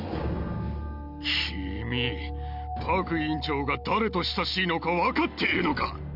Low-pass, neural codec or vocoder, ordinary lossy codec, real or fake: 5.4 kHz; none; none; real